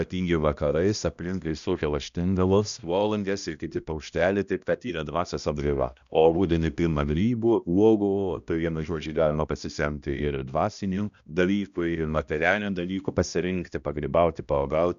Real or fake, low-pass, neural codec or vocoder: fake; 7.2 kHz; codec, 16 kHz, 1 kbps, X-Codec, HuBERT features, trained on balanced general audio